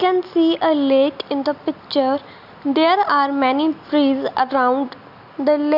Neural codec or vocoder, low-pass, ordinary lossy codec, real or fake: none; 5.4 kHz; none; real